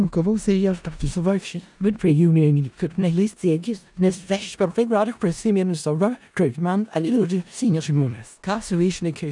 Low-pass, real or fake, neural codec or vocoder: 10.8 kHz; fake; codec, 16 kHz in and 24 kHz out, 0.4 kbps, LongCat-Audio-Codec, four codebook decoder